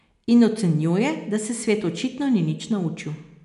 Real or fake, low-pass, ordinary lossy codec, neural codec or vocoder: real; 10.8 kHz; none; none